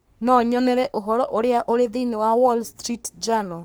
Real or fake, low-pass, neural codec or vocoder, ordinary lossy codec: fake; none; codec, 44.1 kHz, 3.4 kbps, Pupu-Codec; none